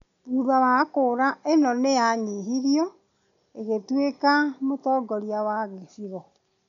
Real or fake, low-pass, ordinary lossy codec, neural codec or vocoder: real; 7.2 kHz; MP3, 96 kbps; none